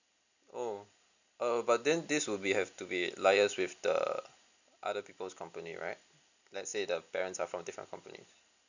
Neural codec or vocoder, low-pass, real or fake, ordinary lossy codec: none; 7.2 kHz; real; none